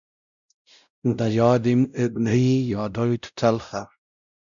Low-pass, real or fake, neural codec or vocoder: 7.2 kHz; fake; codec, 16 kHz, 0.5 kbps, X-Codec, WavLM features, trained on Multilingual LibriSpeech